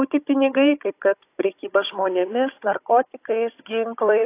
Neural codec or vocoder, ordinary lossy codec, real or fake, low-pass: codec, 16 kHz, 16 kbps, FunCodec, trained on Chinese and English, 50 frames a second; AAC, 24 kbps; fake; 3.6 kHz